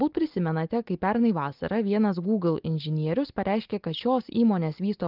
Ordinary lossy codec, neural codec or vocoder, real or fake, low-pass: Opus, 32 kbps; none; real; 5.4 kHz